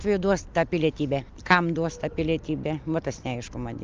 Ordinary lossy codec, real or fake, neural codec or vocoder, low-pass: Opus, 24 kbps; real; none; 7.2 kHz